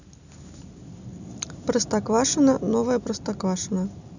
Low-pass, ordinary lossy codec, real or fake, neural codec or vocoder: 7.2 kHz; none; real; none